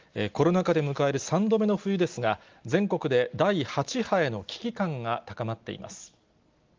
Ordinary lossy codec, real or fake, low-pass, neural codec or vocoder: Opus, 32 kbps; real; 7.2 kHz; none